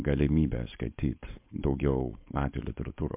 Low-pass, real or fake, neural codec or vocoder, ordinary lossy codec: 3.6 kHz; fake; codec, 16 kHz, 4.8 kbps, FACodec; MP3, 32 kbps